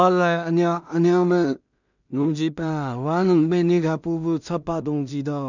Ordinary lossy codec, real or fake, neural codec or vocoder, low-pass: none; fake; codec, 16 kHz in and 24 kHz out, 0.4 kbps, LongCat-Audio-Codec, two codebook decoder; 7.2 kHz